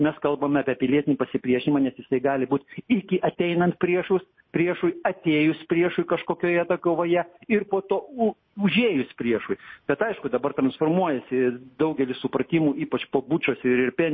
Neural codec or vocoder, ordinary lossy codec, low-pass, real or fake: none; MP3, 24 kbps; 7.2 kHz; real